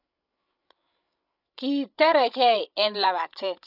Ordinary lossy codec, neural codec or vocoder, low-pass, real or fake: none; codec, 16 kHz, 16 kbps, FreqCodec, larger model; 5.4 kHz; fake